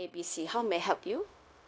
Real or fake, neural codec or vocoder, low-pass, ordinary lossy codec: fake; codec, 16 kHz, 0.9 kbps, LongCat-Audio-Codec; none; none